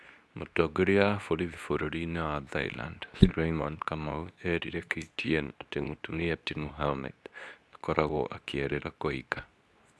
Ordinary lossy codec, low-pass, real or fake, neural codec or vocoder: none; none; fake; codec, 24 kHz, 0.9 kbps, WavTokenizer, medium speech release version 2